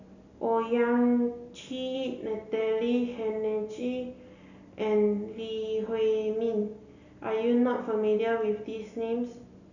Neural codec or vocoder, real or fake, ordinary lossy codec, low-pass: none; real; none; 7.2 kHz